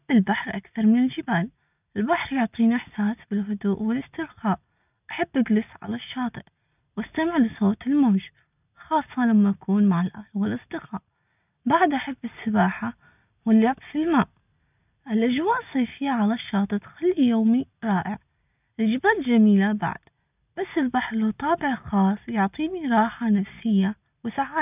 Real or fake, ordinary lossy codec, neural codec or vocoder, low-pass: real; none; none; 3.6 kHz